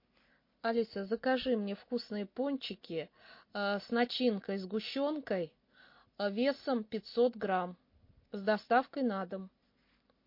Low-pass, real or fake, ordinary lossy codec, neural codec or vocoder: 5.4 kHz; real; MP3, 32 kbps; none